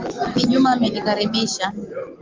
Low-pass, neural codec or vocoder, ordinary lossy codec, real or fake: 7.2 kHz; none; Opus, 32 kbps; real